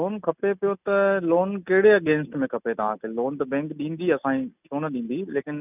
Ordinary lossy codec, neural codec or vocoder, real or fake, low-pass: none; none; real; 3.6 kHz